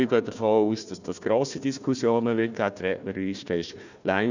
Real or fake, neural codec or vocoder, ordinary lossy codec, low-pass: fake; codec, 16 kHz, 1 kbps, FunCodec, trained on Chinese and English, 50 frames a second; none; 7.2 kHz